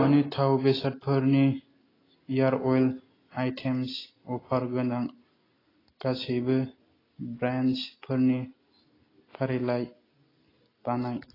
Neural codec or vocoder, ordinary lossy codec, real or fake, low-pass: none; AAC, 24 kbps; real; 5.4 kHz